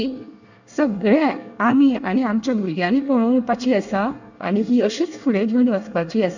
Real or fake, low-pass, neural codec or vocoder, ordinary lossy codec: fake; 7.2 kHz; codec, 24 kHz, 1 kbps, SNAC; none